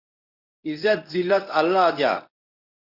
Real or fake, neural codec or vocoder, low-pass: fake; codec, 24 kHz, 0.9 kbps, WavTokenizer, medium speech release version 1; 5.4 kHz